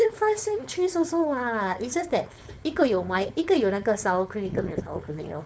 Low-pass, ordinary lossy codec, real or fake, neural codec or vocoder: none; none; fake; codec, 16 kHz, 4.8 kbps, FACodec